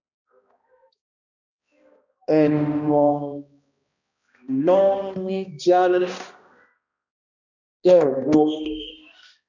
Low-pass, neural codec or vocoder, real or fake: 7.2 kHz; codec, 16 kHz, 1 kbps, X-Codec, HuBERT features, trained on balanced general audio; fake